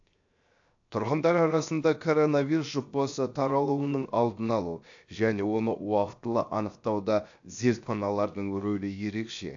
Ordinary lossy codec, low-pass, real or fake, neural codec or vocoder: AAC, 64 kbps; 7.2 kHz; fake; codec, 16 kHz, 0.7 kbps, FocalCodec